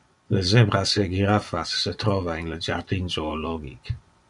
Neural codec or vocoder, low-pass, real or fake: vocoder, 24 kHz, 100 mel bands, Vocos; 10.8 kHz; fake